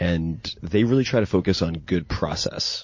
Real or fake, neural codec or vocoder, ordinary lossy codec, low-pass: real; none; MP3, 32 kbps; 7.2 kHz